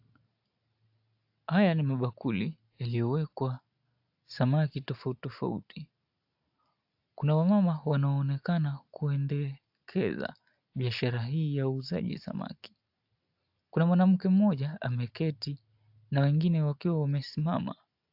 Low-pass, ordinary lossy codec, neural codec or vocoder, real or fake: 5.4 kHz; AAC, 48 kbps; none; real